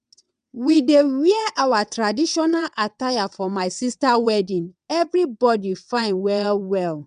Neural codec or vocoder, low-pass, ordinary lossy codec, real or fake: vocoder, 22.05 kHz, 80 mel bands, WaveNeXt; 9.9 kHz; none; fake